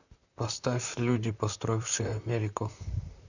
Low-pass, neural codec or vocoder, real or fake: 7.2 kHz; vocoder, 44.1 kHz, 128 mel bands, Pupu-Vocoder; fake